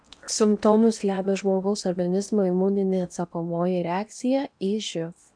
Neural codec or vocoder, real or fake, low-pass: codec, 16 kHz in and 24 kHz out, 0.8 kbps, FocalCodec, streaming, 65536 codes; fake; 9.9 kHz